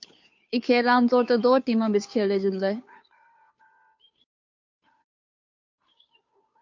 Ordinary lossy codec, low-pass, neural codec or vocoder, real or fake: MP3, 48 kbps; 7.2 kHz; codec, 16 kHz, 2 kbps, FunCodec, trained on Chinese and English, 25 frames a second; fake